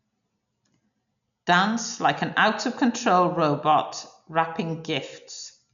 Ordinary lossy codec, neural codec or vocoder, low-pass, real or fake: none; none; 7.2 kHz; real